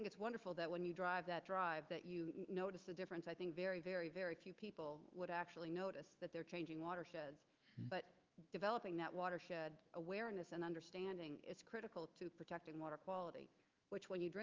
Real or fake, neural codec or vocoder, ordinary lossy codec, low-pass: fake; autoencoder, 48 kHz, 128 numbers a frame, DAC-VAE, trained on Japanese speech; Opus, 32 kbps; 7.2 kHz